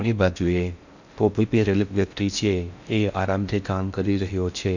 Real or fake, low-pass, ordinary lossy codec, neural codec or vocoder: fake; 7.2 kHz; none; codec, 16 kHz in and 24 kHz out, 0.6 kbps, FocalCodec, streaming, 4096 codes